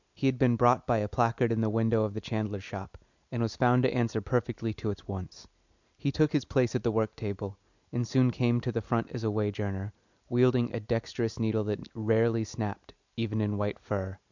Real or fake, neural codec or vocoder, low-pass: real; none; 7.2 kHz